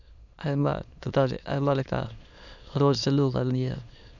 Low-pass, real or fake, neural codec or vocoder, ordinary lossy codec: 7.2 kHz; fake; autoencoder, 22.05 kHz, a latent of 192 numbers a frame, VITS, trained on many speakers; none